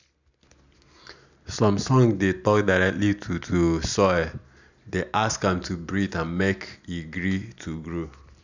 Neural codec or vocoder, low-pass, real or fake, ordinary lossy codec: none; 7.2 kHz; real; none